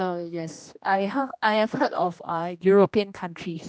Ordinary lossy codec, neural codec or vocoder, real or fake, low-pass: none; codec, 16 kHz, 1 kbps, X-Codec, HuBERT features, trained on general audio; fake; none